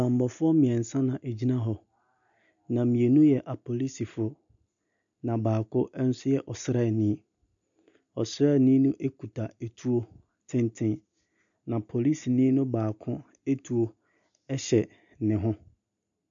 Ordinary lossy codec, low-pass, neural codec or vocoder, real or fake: MP3, 96 kbps; 7.2 kHz; none; real